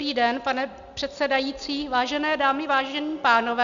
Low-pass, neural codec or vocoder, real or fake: 7.2 kHz; none; real